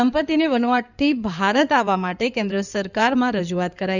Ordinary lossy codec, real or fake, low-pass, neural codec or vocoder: none; fake; 7.2 kHz; codec, 16 kHz in and 24 kHz out, 2.2 kbps, FireRedTTS-2 codec